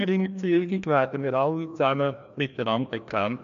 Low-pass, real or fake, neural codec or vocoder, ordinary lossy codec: 7.2 kHz; fake; codec, 16 kHz, 1 kbps, FreqCodec, larger model; none